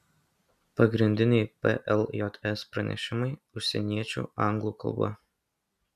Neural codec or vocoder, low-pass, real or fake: none; 14.4 kHz; real